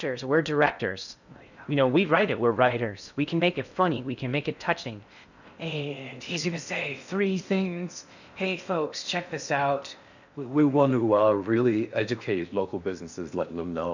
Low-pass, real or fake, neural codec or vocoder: 7.2 kHz; fake; codec, 16 kHz in and 24 kHz out, 0.6 kbps, FocalCodec, streaming, 4096 codes